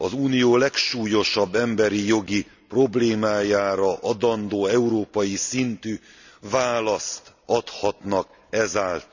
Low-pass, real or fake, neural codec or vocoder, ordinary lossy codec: 7.2 kHz; real; none; none